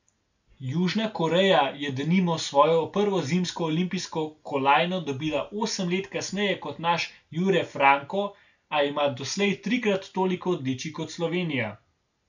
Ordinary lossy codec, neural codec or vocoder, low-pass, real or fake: none; none; 7.2 kHz; real